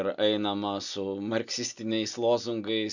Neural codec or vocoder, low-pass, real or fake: none; 7.2 kHz; real